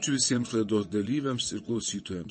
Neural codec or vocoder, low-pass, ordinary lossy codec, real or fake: vocoder, 22.05 kHz, 80 mel bands, WaveNeXt; 9.9 kHz; MP3, 32 kbps; fake